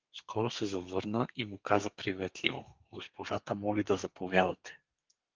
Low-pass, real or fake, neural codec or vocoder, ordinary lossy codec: 7.2 kHz; fake; codec, 32 kHz, 1.9 kbps, SNAC; Opus, 32 kbps